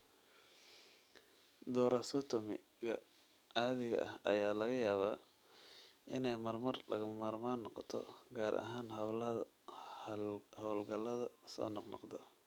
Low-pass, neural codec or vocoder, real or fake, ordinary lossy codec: 19.8 kHz; codec, 44.1 kHz, 7.8 kbps, DAC; fake; none